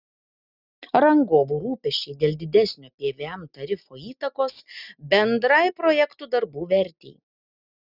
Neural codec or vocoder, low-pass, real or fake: none; 5.4 kHz; real